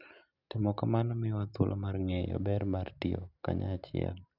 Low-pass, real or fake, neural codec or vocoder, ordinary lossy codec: 5.4 kHz; real; none; none